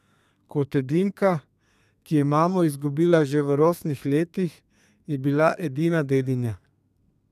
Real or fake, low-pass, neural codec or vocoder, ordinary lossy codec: fake; 14.4 kHz; codec, 32 kHz, 1.9 kbps, SNAC; none